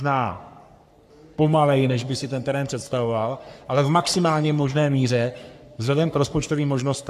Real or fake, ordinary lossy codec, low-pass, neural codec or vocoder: fake; AAC, 96 kbps; 14.4 kHz; codec, 44.1 kHz, 3.4 kbps, Pupu-Codec